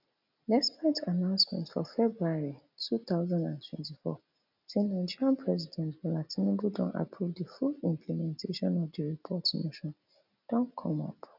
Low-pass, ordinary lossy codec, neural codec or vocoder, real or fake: 5.4 kHz; none; none; real